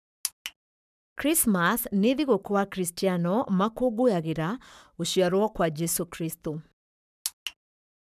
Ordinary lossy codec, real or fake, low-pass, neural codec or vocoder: none; fake; 14.4 kHz; codec, 44.1 kHz, 7.8 kbps, Pupu-Codec